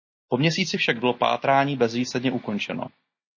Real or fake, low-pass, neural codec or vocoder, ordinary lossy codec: real; 7.2 kHz; none; MP3, 32 kbps